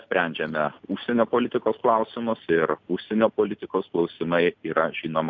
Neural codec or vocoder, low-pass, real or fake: none; 7.2 kHz; real